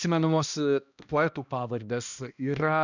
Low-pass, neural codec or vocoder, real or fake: 7.2 kHz; codec, 16 kHz, 1 kbps, X-Codec, HuBERT features, trained on balanced general audio; fake